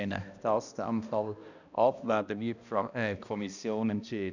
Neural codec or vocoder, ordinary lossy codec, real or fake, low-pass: codec, 16 kHz, 1 kbps, X-Codec, HuBERT features, trained on balanced general audio; none; fake; 7.2 kHz